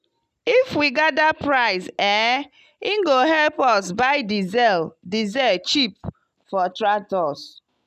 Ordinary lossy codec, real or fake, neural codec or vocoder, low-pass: none; real; none; 14.4 kHz